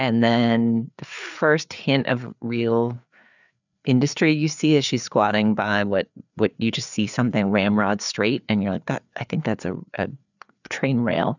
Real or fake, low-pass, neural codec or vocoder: fake; 7.2 kHz; codec, 16 kHz, 4 kbps, FreqCodec, larger model